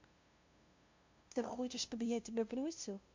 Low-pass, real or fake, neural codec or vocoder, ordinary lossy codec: 7.2 kHz; fake; codec, 16 kHz, 0.5 kbps, FunCodec, trained on LibriTTS, 25 frames a second; MP3, 48 kbps